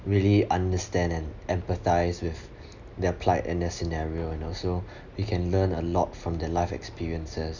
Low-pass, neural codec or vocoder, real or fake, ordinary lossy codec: 7.2 kHz; none; real; none